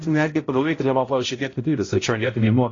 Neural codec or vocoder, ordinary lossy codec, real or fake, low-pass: codec, 16 kHz, 0.5 kbps, X-Codec, HuBERT features, trained on balanced general audio; AAC, 32 kbps; fake; 7.2 kHz